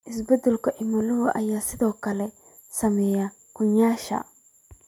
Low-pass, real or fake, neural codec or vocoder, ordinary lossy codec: 19.8 kHz; real; none; none